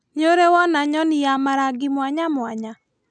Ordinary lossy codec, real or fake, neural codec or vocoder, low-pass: none; real; none; none